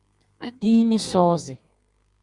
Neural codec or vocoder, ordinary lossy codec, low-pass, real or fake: codec, 32 kHz, 1.9 kbps, SNAC; Opus, 64 kbps; 10.8 kHz; fake